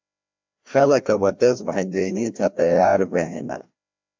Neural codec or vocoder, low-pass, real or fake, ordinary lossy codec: codec, 16 kHz, 1 kbps, FreqCodec, larger model; 7.2 kHz; fake; MP3, 64 kbps